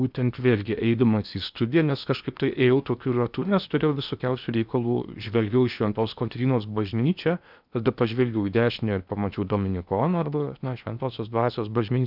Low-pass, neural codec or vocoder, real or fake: 5.4 kHz; codec, 16 kHz in and 24 kHz out, 0.8 kbps, FocalCodec, streaming, 65536 codes; fake